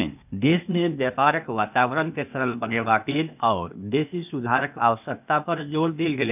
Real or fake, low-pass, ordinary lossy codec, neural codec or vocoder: fake; 3.6 kHz; none; codec, 16 kHz, 0.8 kbps, ZipCodec